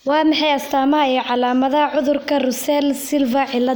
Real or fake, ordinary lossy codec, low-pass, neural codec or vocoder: real; none; none; none